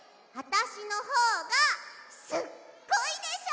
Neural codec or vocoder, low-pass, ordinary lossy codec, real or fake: none; none; none; real